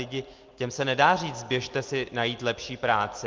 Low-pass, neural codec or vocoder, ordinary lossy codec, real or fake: 7.2 kHz; none; Opus, 32 kbps; real